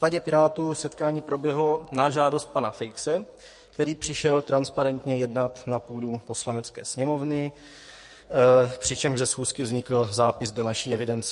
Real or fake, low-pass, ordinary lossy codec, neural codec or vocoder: fake; 14.4 kHz; MP3, 48 kbps; codec, 44.1 kHz, 2.6 kbps, SNAC